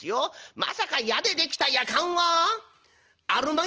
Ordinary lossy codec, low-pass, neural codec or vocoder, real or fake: Opus, 16 kbps; 7.2 kHz; none; real